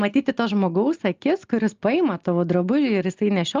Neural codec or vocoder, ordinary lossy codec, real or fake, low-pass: none; Opus, 32 kbps; real; 7.2 kHz